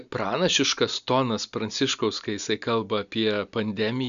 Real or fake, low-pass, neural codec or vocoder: real; 7.2 kHz; none